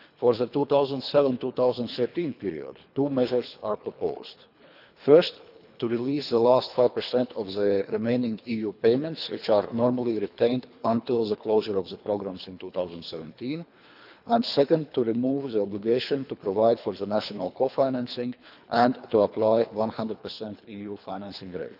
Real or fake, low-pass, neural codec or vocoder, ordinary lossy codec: fake; 5.4 kHz; codec, 24 kHz, 3 kbps, HILCodec; none